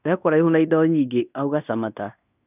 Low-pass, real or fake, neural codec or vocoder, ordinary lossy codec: 3.6 kHz; fake; codec, 16 kHz in and 24 kHz out, 0.9 kbps, LongCat-Audio-Codec, fine tuned four codebook decoder; none